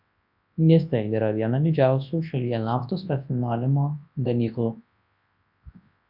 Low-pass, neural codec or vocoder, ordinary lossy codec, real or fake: 5.4 kHz; codec, 24 kHz, 0.9 kbps, WavTokenizer, large speech release; AAC, 48 kbps; fake